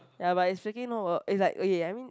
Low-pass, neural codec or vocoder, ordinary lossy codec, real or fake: none; none; none; real